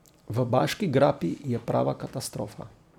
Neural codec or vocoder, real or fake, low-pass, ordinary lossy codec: vocoder, 44.1 kHz, 128 mel bands every 256 samples, BigVGAN v2; fake; 19.8 kHz; none